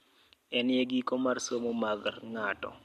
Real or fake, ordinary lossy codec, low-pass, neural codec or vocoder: real; MP3, 64 kbps; 14.4 kHz; none